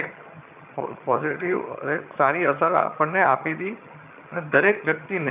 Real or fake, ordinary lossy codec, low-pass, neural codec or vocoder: fake; none; 3.6 kHz; vocoder, 22.05 kHz, 80 mel bands, HiFi-GAN